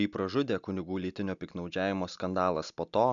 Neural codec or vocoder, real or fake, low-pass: none; real; 7.2 kHz